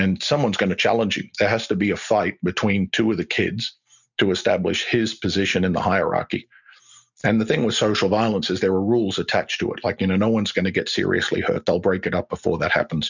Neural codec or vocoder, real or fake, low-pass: none; real; 7.2 kHz